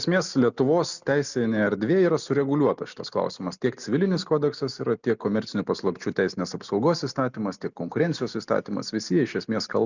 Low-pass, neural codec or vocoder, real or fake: 7.2 kHz; none; real